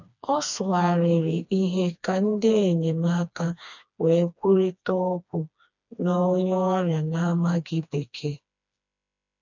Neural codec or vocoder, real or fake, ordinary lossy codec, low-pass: codec, 16 kHz, 2 kbps, FreqCodec, smaller model; fake; none; 7.2 kHz